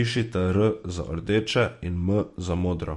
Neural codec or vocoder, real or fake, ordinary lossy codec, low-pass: none; real; MP3, 48 kbps; 14.4 kHz